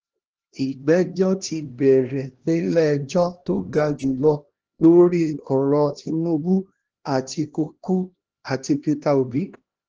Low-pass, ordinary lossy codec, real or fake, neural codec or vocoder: 7.2 kHz; Opus, 16 kbps; fake; codec, 16 kHz, 1 kbps, X-Codec, HuBERT features, trained on LibriSpeech